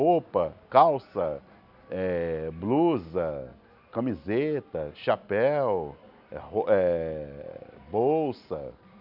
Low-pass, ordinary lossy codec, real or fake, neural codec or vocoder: 5.4 kHz; none; real; none